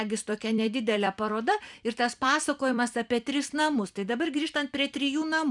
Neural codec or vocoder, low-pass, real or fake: vocoder, 44.1 kHz, 128 mel bands every 256 samples, BigVGAN v2; 10.8 kHz; fake